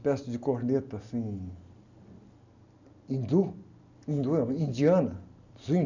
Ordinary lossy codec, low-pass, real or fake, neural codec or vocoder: none; 7.2 kHz; real; none